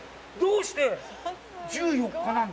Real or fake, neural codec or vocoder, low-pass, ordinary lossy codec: real; none; none; none